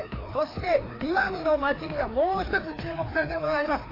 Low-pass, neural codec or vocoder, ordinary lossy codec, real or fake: 5.4 kHz; codec, 16 kHz, 2 kbps, FreqCodec, larger model; AAC, 24 kbps; fake